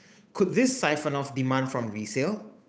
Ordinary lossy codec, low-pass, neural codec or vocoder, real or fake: none; none; codec, 16 kHz, 8 kbps, FunCodec, trained on Chinese and English, 25 frames a second; fake